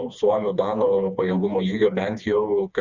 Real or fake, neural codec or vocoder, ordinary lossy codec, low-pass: fake; codec, 16 kHz, 2 kbps, FreqCodec, smaller model; Opus, 64 kbps; 7.2 kHz